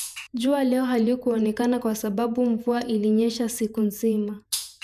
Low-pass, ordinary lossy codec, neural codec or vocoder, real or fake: 14.4 kHz; none; vocoder, 48 kHz, 128 mel bands, Vocos; fake